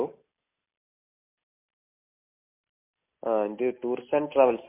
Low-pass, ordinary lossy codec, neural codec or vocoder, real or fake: 3.6 kHz; MP3, 24 kbps; none; real